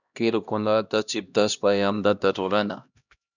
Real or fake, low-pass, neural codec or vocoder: fake; 7.2 kHz; codec, 16 kHz, 1 kbps, X-Codec, HuBERT features, trained on LibriSpeech